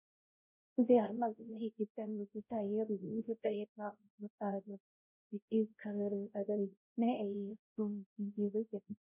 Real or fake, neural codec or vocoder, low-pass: fake; codec, 16 kHz, 0.5 kbps, X-Codec, WavLM features, trained on Multilingual LibriSpeech; 3.6 kHz